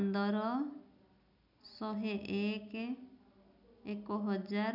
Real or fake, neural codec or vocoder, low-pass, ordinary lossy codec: real; none; 5.4 kHz; none